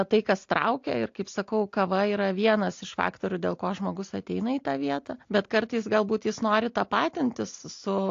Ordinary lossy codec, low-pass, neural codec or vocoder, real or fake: AAC, 48 kbps; 7.2 kHz; none; real